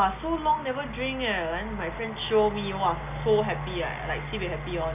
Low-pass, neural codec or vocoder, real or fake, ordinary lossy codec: 3.6 kHz; none; real; none